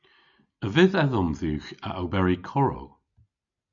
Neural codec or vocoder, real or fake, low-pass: none; real; 7.2 kHz